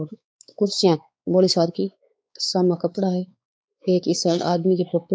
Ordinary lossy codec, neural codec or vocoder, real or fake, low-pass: none; codec, 16 kHz, 4 kbps, X-Codec, WavLM features, trained on Multilingual LibriSpeech; fake; none